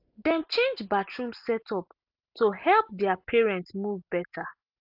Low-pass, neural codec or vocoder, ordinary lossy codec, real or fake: 5.4 kHz; none; AAC, 48 kbps; real